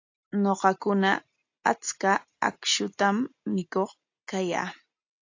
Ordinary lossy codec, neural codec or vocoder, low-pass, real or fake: AAC, 48 kbps; none; 7.2 kHz; real